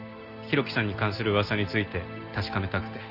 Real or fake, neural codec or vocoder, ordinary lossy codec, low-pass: real; none; Opus, 32 kbps; 5.4 kHz